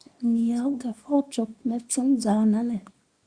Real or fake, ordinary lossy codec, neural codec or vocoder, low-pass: fake; Opus, 64 kbps; codec, 24 kHz, 0.9 kbps, WavTokenizer, small release; 9.9 kHz